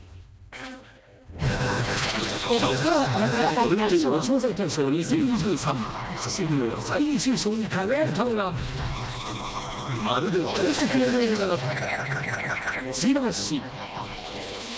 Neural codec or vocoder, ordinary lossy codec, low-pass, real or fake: codec, 16 kHz, 1 kbps, FreqCodec, smaller model; none; none; fake